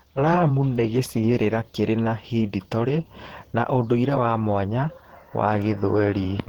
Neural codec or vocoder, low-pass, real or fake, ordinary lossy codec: vocoder, 48 kHz, 128 mel bands, Vocos; 19.8 kHz; fake; Opus, 16 kbps